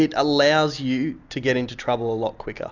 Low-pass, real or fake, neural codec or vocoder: 7.2 kHz; real; none